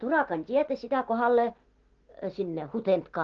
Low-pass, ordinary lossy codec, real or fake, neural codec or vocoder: 7.2 kHz; Opus, 16 kbps; real; none